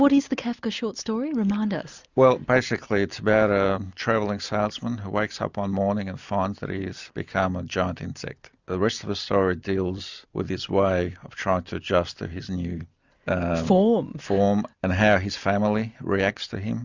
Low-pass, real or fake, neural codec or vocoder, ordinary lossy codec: 7.2 kHz; real; none; Opus, 64 kbps